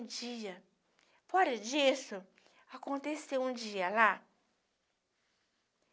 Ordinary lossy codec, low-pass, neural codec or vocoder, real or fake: none; none; none; real